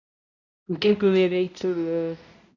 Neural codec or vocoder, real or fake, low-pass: codec, 16 kHz, 0.5 kbps, X-Codec, HuBERT features, trained on balanced general audio; fake; 7.2 kHz